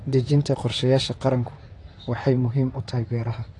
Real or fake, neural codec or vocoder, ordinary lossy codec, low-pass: fake; vocoder, 44.1 kHz, 128 mel bands, Pupu-Vocoder; AAC, 48 kbps; 10.8 kHz